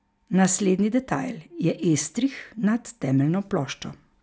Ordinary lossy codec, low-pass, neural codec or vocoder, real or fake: none; none; none; real